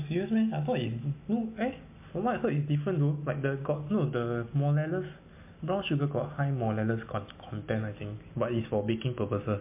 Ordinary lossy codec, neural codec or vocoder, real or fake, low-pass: MP3, 24 kbps; none; real; 3.6 kHz